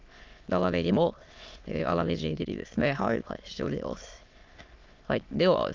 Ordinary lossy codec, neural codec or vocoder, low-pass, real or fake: Opus, 32 kbps; autoencoder, 22.05 kHz, a latent of 192 numbers a frame, VITS, trained on many speakers; 7.2 kHz; fake